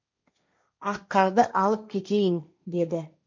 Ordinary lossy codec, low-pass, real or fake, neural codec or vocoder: MP3, 64 kbps; 7.2 kHz; fake; codec, 16 kHz, 1.1 kbps, Voila-Tokenizer